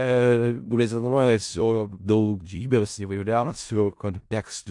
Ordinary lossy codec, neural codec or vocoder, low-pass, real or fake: AAC, 64 kbps; codec, 16 kHz in and 24 kHz out, 0.4 kbps, LongCat-Audio-Codec, four codebook decoder; 10.8 kHz; fake